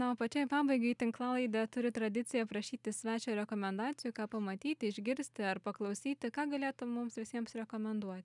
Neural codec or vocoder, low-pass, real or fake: none; 10.8 kHz; real